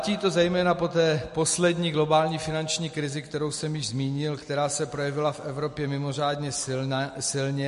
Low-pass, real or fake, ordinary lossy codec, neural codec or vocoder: 14.4 kHz; real; MP3, 48 kbps; none